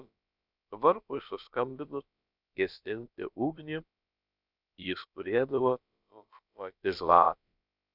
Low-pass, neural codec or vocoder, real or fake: 5.4 kHz; codec, 16 kHz, about 1 kbps, DyCAST, with the encoder's durations; fake